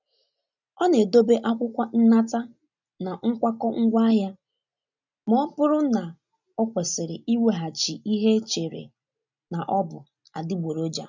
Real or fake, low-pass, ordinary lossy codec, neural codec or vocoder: real; 7.2 kHz; none; none